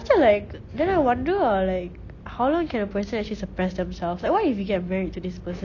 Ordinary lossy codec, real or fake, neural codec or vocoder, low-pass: none; real; none; 7.2 kHz